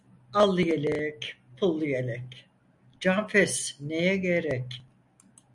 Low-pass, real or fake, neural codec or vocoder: 10.8 kHz; real; none